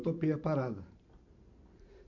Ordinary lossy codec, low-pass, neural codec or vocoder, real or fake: none; 7.2 kHz; vocoder, 44.1 kHz, 128 mel bands every 512 samples, BigVGAN v2; fake